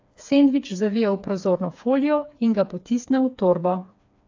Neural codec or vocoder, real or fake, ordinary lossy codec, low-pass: codec, 16 kHz, 4 kbps, FreqCodec, smaller model; fake; AAC, 48 kbps; 7.2 kHz